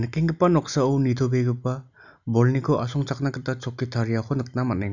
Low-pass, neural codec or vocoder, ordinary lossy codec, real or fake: 7.2 kHz; none; none; real